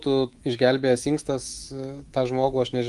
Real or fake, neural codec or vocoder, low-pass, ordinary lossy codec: fake; codec, 24 kHz, 3.1 kbps, DualCodec; 10.8 kHz; Opus, 24 kbps